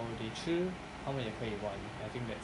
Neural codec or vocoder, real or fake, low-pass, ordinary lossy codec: none; real; 10.8 kHz; none